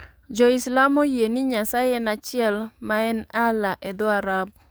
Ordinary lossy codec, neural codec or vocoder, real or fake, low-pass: none; codec, 44.1 kHz, 7.8 kbps, DAC; fake; none